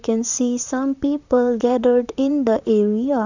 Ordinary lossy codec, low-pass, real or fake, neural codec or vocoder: none; 7.2 kHz; fake; vocoder, 44.1 kHz, 128 mel bands, Pupu-Vocoder